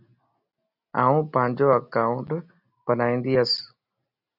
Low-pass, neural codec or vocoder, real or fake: 5.4 kHz; none; real